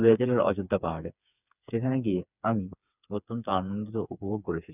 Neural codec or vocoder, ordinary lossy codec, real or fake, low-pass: codec, 16 kHz, 4 kbps, FreqCodec, smaller model; none; fake; 3.6 kHz